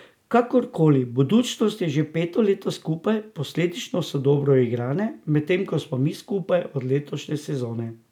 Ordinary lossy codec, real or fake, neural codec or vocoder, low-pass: none; real; none; 19.8 kHz